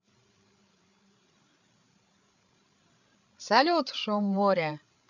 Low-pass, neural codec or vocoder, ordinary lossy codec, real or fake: 7.2 kHz; codec, 16 kHz, 8 kbps, FreqCodec, larger model; none; fake